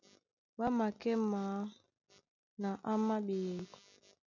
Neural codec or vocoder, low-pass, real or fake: none; 7.2 kHz; real